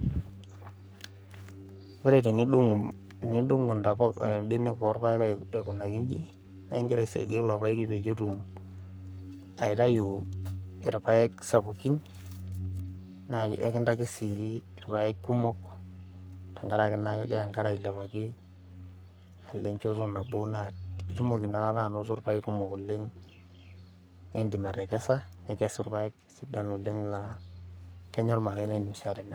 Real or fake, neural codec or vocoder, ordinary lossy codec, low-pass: fake; codec, 44.1 kHz, 3.4 kbps, Pupu-Codec; none; none